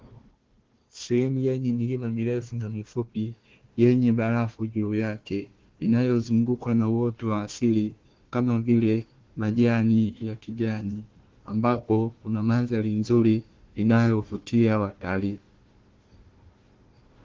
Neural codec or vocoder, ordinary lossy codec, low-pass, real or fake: codec, 16 kHz, 1 kbps, FunCodec, trained on Chinese and English, 50 frames a second; Opus, 16 kbps; 7.2 kHz; fake